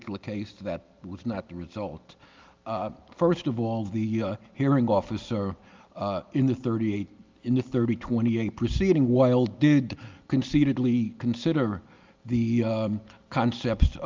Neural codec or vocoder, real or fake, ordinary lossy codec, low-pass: none; real; Opus, 24 kbps; 7.2 kHz